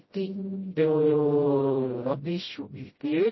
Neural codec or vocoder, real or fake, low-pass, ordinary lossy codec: codec, 16 kHz, 0.5 kbps, FreqCodec, smaller model; fake; 7.2 kHz; MP3, 24 kbps